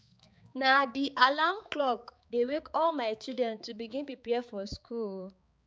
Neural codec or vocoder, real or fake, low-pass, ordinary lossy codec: codec, 16 kHz, 4 kbps, X-Codec, HuBERT features, trained on balanced general audio; fake; none; none